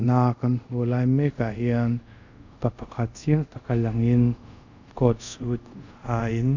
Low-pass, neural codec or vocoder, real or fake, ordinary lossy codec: 7.2 kHz; codec, 24 kHz, 0.5 kbps, DualCodec; fake; none